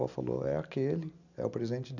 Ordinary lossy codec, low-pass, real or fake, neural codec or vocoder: none; 7.2 kHz; real; none